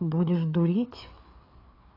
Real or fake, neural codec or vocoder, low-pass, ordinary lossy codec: fake; codec, 16 kHz, 4 kbps, FreqCodec, larger model; 5.4 kHz; AAC, 24 kbps